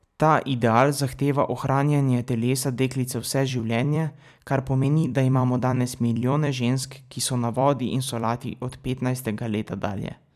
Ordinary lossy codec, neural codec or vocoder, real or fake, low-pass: none; vocoder, 44.1 kHz, 128 mel bands every 256 samples, BigVGAN v2; fake; 14.4 kHz